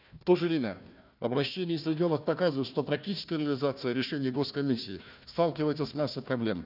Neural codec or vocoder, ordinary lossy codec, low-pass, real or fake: codec, 16 kHz, 1 kbps, FunCodec, trained on Chinese and English, 50 frames a second; none; 5.4 kHz; fake